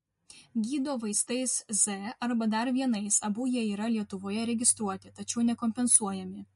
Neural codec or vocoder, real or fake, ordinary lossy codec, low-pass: none; real; MP3, 48 kbps; 14.4 kHz